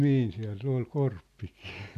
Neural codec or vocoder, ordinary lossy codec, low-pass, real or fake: none; none; 14.4 kHz; real